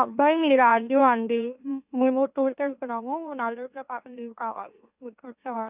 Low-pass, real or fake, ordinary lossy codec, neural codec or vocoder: 3.6 kHz; fake; none; autoencoder, 44.1 kHz, a latent of 192 numbers a frame, MeloTTS